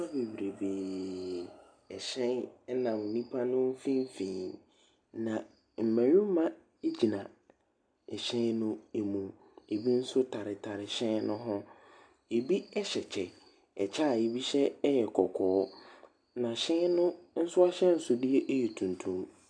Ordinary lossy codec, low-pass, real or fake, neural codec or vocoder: MP3, 96 kbps; 9.9 kHz; real; none